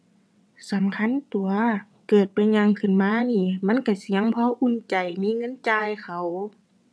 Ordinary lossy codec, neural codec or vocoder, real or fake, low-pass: none; vocoder, 22.05 kHz, 80 mel bands, WaveNeXt; fake; none